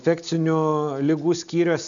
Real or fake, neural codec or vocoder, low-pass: real; none; 7.2 kHz